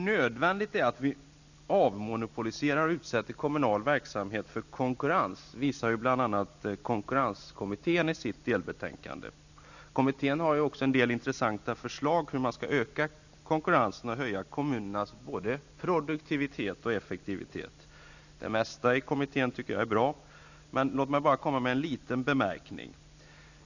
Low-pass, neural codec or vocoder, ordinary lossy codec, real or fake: 7.2 kHz; none; none; real